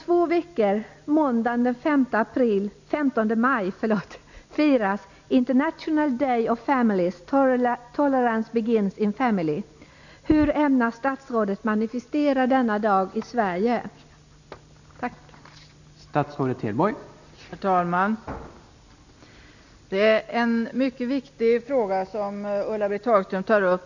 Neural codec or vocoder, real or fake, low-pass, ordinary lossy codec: none; real; 7.2 kHz; none